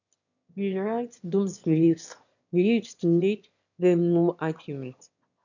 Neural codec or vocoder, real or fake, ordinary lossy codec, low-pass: autoencoder, 22.05 kHz, a latent of 192 numbers a frame, VITS, trained on one speaker; fake; none; 7.2 kHz